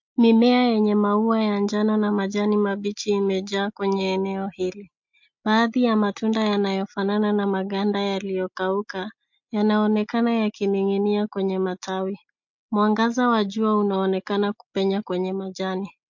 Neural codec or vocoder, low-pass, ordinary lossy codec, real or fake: none; 7.2 kHz; MP3, 48 kbps; real